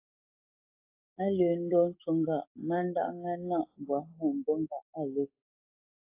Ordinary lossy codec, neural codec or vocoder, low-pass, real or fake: AAC, 24 kbps; codec, 44.1 kHz, 7.8 kbps, DAC; 3.6 kHz; fake